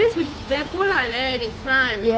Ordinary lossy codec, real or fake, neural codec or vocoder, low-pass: none; fake; codec, 16 kHz, 2 kbps, FunCodec, trained on Chinese and English, 25 frames a second; none